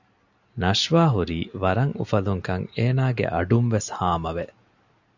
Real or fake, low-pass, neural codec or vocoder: real; 7.2 kHz; none